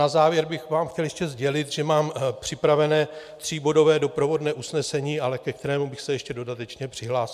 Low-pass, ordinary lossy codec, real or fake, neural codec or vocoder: 14.4 kHz; MP3, 96 kbps; real; none